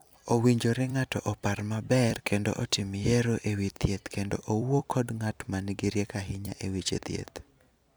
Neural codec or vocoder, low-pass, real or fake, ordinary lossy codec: vocoder, 44.1 kHz, 128 mel bands every 256 samples, BigVGAN v2; none; fake; none